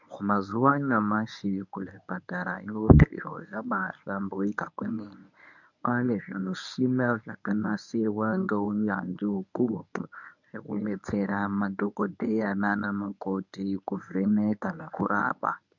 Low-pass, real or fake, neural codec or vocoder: 7.2 kHz; fake; codec, 24 kHz, 0.9 kbps, WavTokenizer, medium speech release version 1